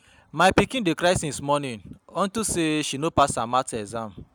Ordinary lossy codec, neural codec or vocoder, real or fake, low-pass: none; none; real; none